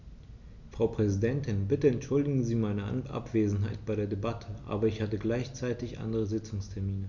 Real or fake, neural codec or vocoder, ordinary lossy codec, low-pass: real; none; none; 7.2 kHz